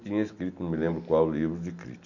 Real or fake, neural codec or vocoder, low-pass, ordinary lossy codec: real; none; 7.2 kHz; none